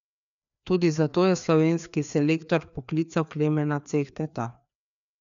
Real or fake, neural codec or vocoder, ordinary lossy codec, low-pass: fake; codec, 16 kHz, 2 kbps, FreqCodec, larger model; none; 7.2 kHz